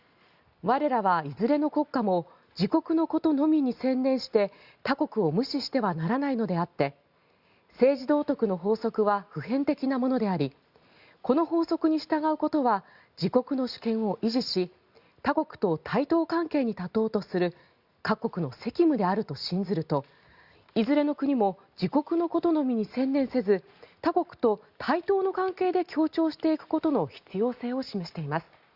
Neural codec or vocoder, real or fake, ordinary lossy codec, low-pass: none; real; Opus, 64 kbps; 5.4 kHz